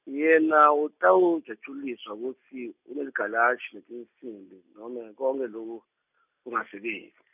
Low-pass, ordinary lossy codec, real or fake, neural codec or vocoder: 3.6 kHz; none; real; none